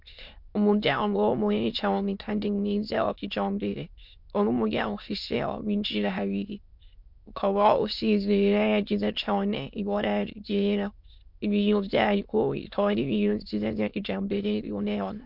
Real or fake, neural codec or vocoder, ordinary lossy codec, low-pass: fake; autoencoder, 22.05 kHz, a latent of 192 numbers a frame, VITS, trained on many speakers; MP3, 48 kbps; 5.4 kHz